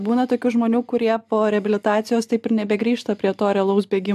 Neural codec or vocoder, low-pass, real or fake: none; 14.4 kHz; real